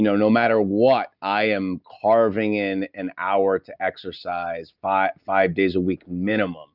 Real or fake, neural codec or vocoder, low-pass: real; none; 5.4 kHz